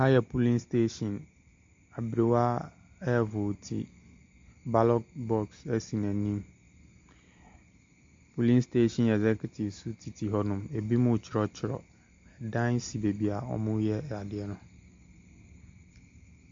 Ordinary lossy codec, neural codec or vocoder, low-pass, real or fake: MP3, 48 kbps; none; 7.2 kHz; real